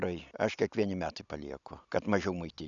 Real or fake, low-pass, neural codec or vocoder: real; 7.2 kHz; none